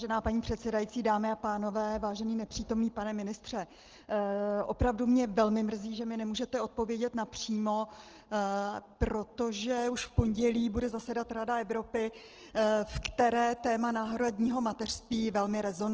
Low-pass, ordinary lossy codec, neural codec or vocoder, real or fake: 7.2 kHz; Opus, 16 kbps; none; real